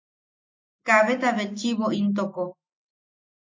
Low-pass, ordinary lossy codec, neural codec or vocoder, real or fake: 7.2 kHz; MP3, 48 kbps; none; real